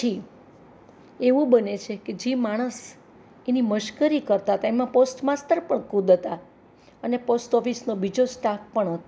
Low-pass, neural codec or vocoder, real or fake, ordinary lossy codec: none; none; real; none